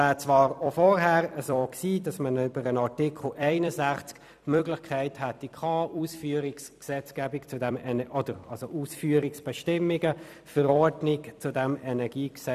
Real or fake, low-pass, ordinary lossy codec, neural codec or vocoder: fake; 14.4 kHz; none; vocoder, 44.1 kHz, 128 mel bands every 256 samples, BigVGAN v2